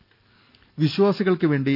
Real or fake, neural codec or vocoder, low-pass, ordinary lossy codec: real; none; 5.4 kHz; none